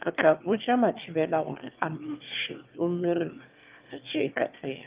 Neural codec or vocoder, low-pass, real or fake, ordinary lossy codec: autoencoder, 22.05 kHz, a latent of 192 numbers a frame, VITS, trained on one speaker; 3.6 kHz; fake; Opus, 32 kbps